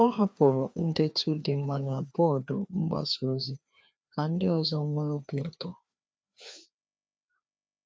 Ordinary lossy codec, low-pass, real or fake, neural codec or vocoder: none; none; fake; codec, 16 kHz, 2 kbps, FreqCodec, larger model